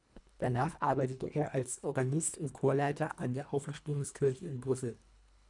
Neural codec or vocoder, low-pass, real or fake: codec, 24 kHz, 1.5 kbps, HILCodec; 10.8 kHz; fake